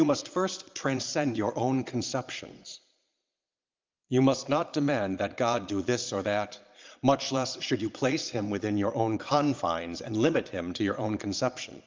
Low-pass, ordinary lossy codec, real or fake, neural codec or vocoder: 7.2 kHz; Opus, 24 kbps; fake; vocoder, 22.05 kHz, 80 mel bands, Vocos